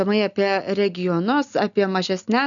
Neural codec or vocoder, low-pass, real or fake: none; 7.2 kHz; real